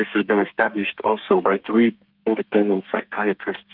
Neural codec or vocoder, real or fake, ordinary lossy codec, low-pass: codec, 32 kHz, 1.9 kbps, SNAC; fake; Opus, 32 kbps; 5.4 kHz